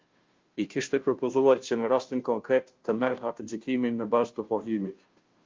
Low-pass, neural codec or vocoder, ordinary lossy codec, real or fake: 7.2 kHz; codec, 16 kHz, 0.5 kbps, FunCodec, trained on Chinese and English, 25 frames a second; Opus, 32 kbps; fake